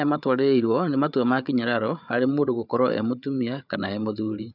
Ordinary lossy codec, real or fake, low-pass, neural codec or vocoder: none; fake; 5.4 kHz; codec, 16 kHz, 8 kbps, FreqCodec, larger model